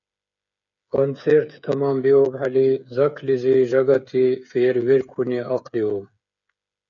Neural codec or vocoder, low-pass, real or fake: codec, 16 kHz, 8 kbps, FreqCodec, smaller model; 7.2 kHz; fake